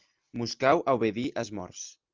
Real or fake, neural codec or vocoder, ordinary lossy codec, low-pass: real; none; Opus, 32 kbps; 7.2 kHz